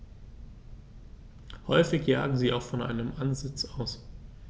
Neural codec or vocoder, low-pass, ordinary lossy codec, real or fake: none; none; none; real